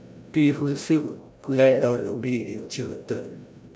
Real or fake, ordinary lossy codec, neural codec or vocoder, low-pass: fake; none; codec, 16 kHz, 0.5 kbps, FreqCodec, larger model; none